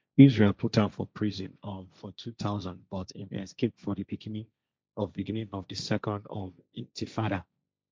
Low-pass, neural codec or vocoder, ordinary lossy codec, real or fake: none; codec, 16 kHz, 1.1 kbps, Voila-Tokenizer; none; fake